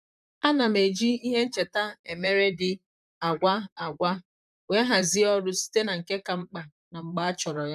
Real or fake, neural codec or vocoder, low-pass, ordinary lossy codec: fake; vocoder, 44.1 kHz, 128 mel bands, Pupu-Vocoder; 14.4 kHz; none